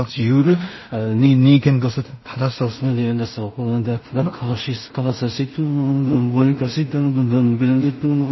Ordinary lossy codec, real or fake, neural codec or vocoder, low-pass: MP3, 24 kbps; fake; codec, 16 kHz in and 24 kHz out, 0.4 kbps, LongCat-Audio-Codec, two codebook decoder; 7.2 kHz